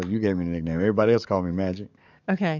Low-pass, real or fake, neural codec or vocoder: 7.2 kHz; fake; codec, 44.1 kHz, 7.8 kbps, DAC